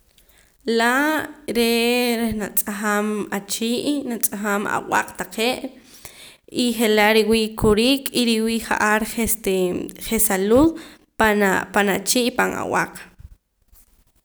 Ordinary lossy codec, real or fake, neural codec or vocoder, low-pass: none; real; none; none